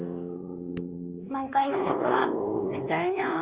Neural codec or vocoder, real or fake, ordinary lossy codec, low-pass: codec, 16 kHz, 2 kbps, X-Codec, WavLM features, trained on Multilingual LibriSpeech; fake; Opus, 24 kbps; 3.6 kHz